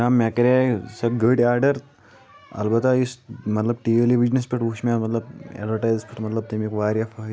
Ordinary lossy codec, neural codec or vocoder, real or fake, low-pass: none; none; real; none